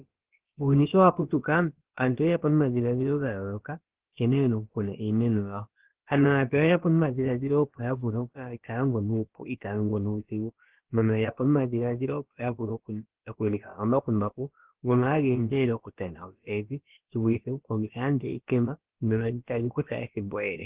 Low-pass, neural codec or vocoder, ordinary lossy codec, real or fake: 3.6 kHz; codec, 16 kHz, about 1 kbps, DyCAST, with the encoder's durations; Opus, 16 kbps; fake